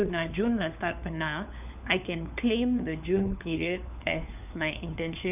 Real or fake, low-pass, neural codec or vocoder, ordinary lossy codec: fake; 3.6 kHz; codec, 16 kHz, 4 kbps, FunCodec, trained on LibriTTS, 50 frames a second; none